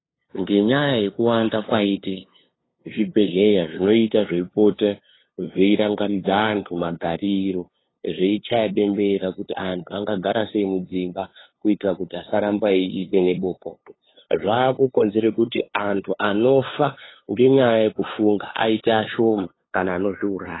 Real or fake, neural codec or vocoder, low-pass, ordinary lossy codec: fake; codec, 16 kHz, 2 kbps, FunCodec, trained on LibriTTS, 25 frames a second; 7.2 kHz; AAC, 16 kbps